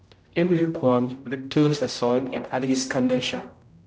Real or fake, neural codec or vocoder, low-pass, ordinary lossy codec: fake; codec, 16 kHz, 0.5 kbps, X-Codec, HuBERT features, trained on general audio; none; none